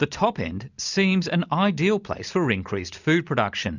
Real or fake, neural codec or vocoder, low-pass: real; none; 7.2 kHz